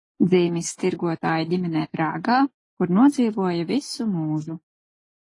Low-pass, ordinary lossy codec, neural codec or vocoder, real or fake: 10.8 kHz; AAC, 32 kbps; none; real